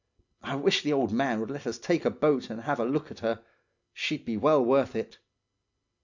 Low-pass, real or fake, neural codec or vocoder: 7.2 kHz; real; none